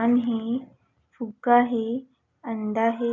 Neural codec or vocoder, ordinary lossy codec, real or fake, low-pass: none; none; real; 7.2 kHz